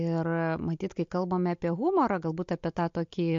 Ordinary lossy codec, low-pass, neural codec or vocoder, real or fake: MP3, 48 kbps; 7.2 kHz; none; real